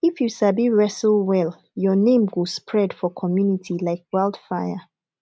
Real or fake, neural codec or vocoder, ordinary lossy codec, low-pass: real; none; none; none